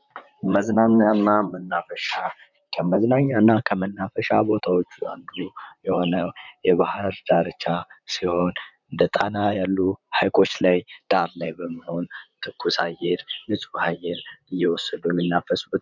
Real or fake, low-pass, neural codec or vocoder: fake; 7.2 kHz; vocoder, 44.1 kHz, 128 mel bands, Pupu-Vocoder